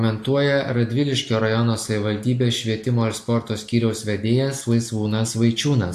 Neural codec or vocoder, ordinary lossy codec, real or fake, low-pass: none; AAC, 64 kbps; real; 14.4 kHz